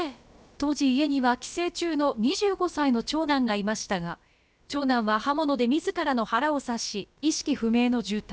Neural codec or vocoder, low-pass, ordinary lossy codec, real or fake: codec, 16 kHz, about 1 kbps, DyCAST, with the encoder's durations; none; none; fake